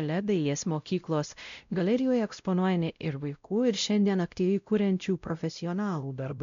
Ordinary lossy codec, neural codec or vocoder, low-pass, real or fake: MP3, 64 kbps; codec, 16 kHz, 0.5 kbps, X-Codec, WavLM features, trained on Multilingual LibriSpeech; 7.2 kHz; fake